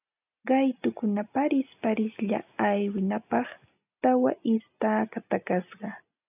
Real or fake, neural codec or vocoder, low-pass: real; none; 3.6 kHz